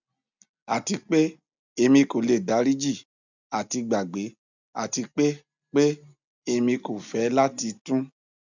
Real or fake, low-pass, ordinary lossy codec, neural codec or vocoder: real; 7.2 kHz; none; none